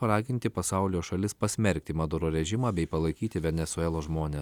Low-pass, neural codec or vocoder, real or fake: 19.8 kHz; none; real